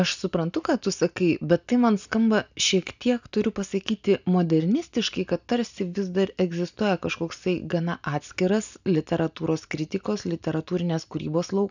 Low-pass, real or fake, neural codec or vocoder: 7.2 kHz; real; none